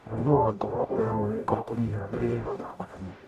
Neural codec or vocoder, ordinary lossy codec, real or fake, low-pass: codec, 44.1 kHz, 0.9 kbps, DAC; none; fake; 14.4 kHz